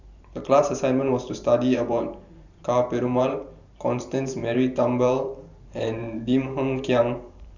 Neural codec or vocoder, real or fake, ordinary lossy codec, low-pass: vocoder, 44.1 kHz, 128 mel bands every 512 samples, BigVGAN v2; fake; none; 7.2 kHz